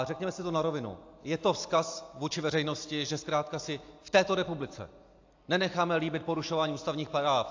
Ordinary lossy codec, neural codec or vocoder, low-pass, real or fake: AAC, 48 kbps; none; 7.2 kHz; real